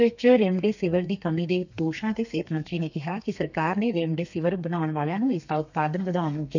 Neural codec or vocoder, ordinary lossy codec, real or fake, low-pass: codec, 32 kHz, 1.9 kbps, SNAC; none; fake; 7.2 kHz